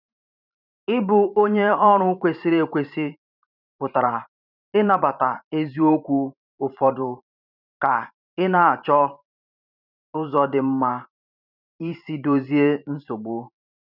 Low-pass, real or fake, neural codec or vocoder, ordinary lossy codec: 5.4 kHz; fake; vocoder, 44.1 kHz, 128 mel bands every 512 samples, BigVGAN v2; none